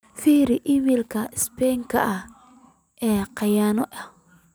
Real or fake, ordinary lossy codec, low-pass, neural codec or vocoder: real; none; none; none